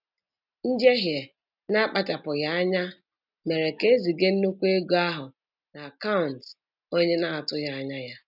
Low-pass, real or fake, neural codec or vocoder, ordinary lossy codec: 5.4 kHz; real; none; none